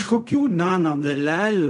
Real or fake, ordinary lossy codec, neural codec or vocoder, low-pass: fake; AAC, 96 kbps; codec, 16 kHz in and 24 kHz out, 0.4 kbps, LongCat-Audio-Codec, fine tuned four codebook decoder; 10.8 kHz